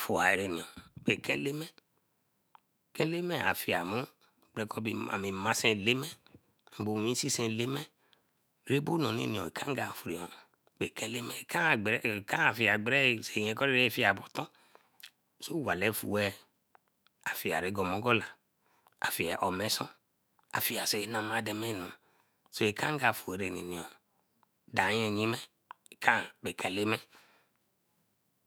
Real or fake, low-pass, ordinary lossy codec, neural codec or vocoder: fake; none; none; autoencoder, 48 kHz, 128 numbers a frame, DAC-VAE, trained on Japanese speech